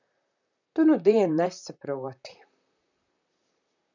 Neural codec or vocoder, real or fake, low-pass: vocoder, 44.1 kHz, 128 mel bands, Pupu-Vocoder; fake; 7.2 kHz